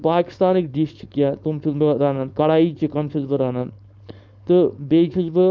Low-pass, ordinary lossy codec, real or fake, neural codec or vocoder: none; none; fake; codec, 16 kHz, 4.8 kbps, FACodec